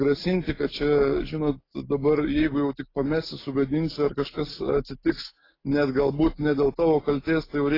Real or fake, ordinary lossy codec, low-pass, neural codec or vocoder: real; AAC, 24 kbps; 5.4 kHz; none